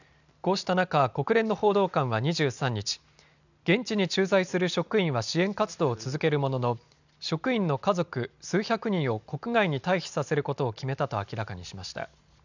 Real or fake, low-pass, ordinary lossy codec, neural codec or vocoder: real; 7.2 kHz; none; none